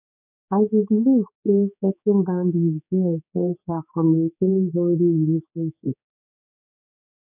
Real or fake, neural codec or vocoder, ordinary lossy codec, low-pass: fake; codec, 16 kHz, 4 kbps, X-Codec, HuBERT features, trained on general audio; none; 3.6 kHz